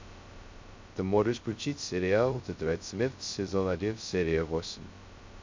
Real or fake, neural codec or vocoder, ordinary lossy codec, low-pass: fake; codec, 16 kHz, 0.2 kbps, FocalCodec; MP3, 64 kbps; 7.2 kHz